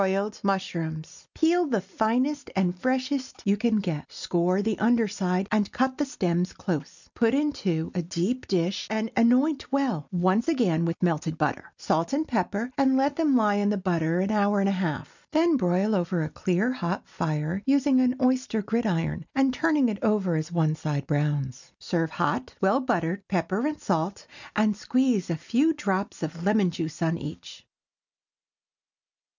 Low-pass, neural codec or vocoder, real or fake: 7.2 kHz; none; real